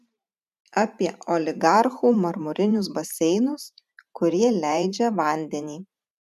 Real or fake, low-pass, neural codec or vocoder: fake; 14.4 kHz; vocoder, 44.1 kHz, 128 mel bands every 512 samples, BigVGAN v2